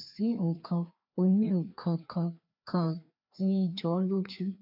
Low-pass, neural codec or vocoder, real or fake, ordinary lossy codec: 5.4 kHz; codec, 16 kHz, 2 kbps, FreqCodec, larger model; fake; none